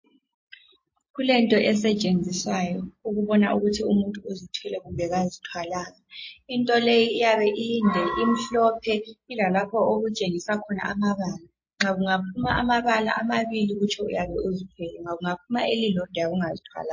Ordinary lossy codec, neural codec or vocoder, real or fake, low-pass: MP3, 32 kbps; none; real; 7.2 kHz